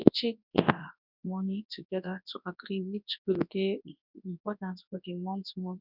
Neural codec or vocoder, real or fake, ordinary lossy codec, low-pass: codec, 24 kHz, 0.9 kbps, WavTokenizer, large speech release; fake; none; 5.4 kHz